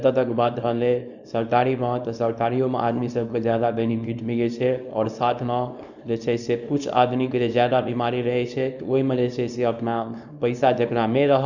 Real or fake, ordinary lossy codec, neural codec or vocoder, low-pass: fake; none; codec, 24 kHz, 0.9 kbps, WavTokenizer, small release; 7.2 kHz